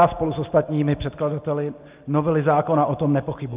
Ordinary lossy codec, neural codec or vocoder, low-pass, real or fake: Opus, 24 kbps; none; 3.6 kHz; real